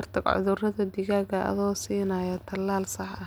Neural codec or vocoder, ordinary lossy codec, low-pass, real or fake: none; none; none; real